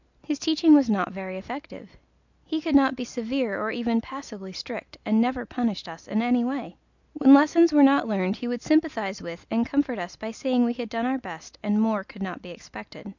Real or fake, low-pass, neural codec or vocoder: real; 7.2 kHz; none